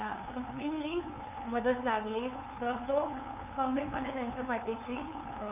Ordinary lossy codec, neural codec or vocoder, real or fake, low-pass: none; codec, 16 kHz, 2 kbps, FunCodec, trained on LibriTTS, 25 frames a second; fake; 3.6 kHz